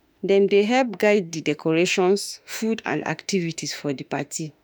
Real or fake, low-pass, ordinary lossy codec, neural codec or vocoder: fake; none; none; autoencoder, 48 kHz, 32 numbers a frame, DAC-VAE, trained on Japanese speech